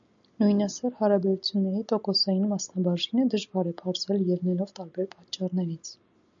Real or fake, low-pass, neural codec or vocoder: real; 7.2 kHz; none